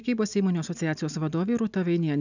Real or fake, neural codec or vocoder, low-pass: real; none; 7.2 kHz